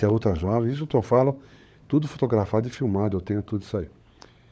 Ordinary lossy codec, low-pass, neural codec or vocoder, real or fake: none; none; codec, 16 kHz, 16 kbps, FunCodec, trained on LibriTTS, 50 frames a second; fake